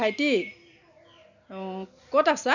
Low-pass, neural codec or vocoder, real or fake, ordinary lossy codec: 7.2 kHz; none; real; none